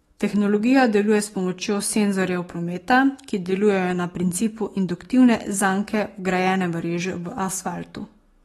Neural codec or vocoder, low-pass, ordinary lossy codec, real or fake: autoencoder, 48 kHz, 128 numbers a frame, DAC-VAE, trained on Japanese speech; 19.8 kHz; AAC, 32 kbps; fake